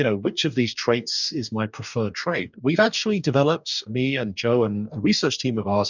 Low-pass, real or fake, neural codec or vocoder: 7.2 kHz; fake; codec, 44.1 kHz, 2.6 kbps, DAC